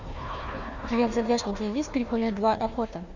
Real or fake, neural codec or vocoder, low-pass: fake; codec, 16 kHz, 1 kbps, FunCodec, trained on Chinese and English, 50 frames a second; 7.2 kHz